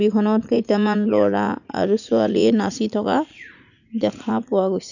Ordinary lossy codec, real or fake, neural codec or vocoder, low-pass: none; fake; vocoder, 44.1 kHz, 80 mel bands, Vocos; 7.2 kHz